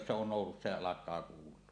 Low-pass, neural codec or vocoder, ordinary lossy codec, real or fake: 9.9 kHz; none; none; real